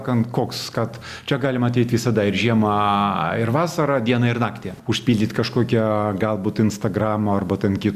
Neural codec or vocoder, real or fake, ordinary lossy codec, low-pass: none; real; Opus, 64 kbps; 14.4 kHz